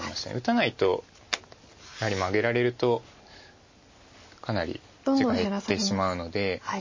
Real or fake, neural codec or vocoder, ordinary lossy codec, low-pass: real; none; MP3, 32 kbps; 7.2 kHz